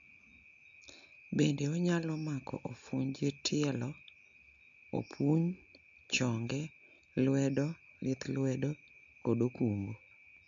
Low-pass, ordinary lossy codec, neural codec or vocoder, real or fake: 7.2 kHz; MP3, 64 kbps; none; real